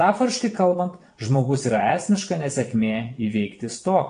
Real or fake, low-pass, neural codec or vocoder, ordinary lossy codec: fake; 9.9 kHz; vocoder, 22.05 kHz, 80 mel bands, WaveNeXt; AAC, 48 kbps